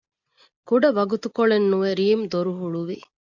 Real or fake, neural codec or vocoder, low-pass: real; none; 7.2 kHz